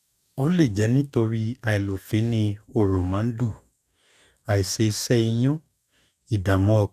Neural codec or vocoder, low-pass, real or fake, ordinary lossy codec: codec, 44.1 kHz, 2.6 kbps, DAC; 14.4 kHz; fake; none